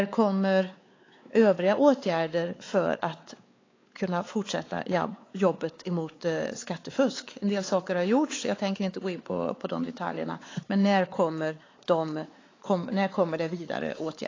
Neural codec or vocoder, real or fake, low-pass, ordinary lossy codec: codec, 16 kHz, 4 kbps, X-Codec, WavLM features, trained on Multilingual LibriSpeech; fake; 7.2 kHz; AAC, 32 kbps